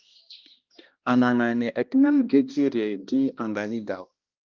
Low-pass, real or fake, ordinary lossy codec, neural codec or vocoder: 7.2 kHz; fake; Opus, 24 kbps; codec, 16 kHz, 1 kbps, X-Codec, HuBERT features, trained on balanced general audio